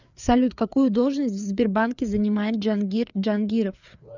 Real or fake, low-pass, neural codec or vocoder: fake; 7.2 kHz; codec, 16 kHz, 4 kbps, FreqCodec, larger model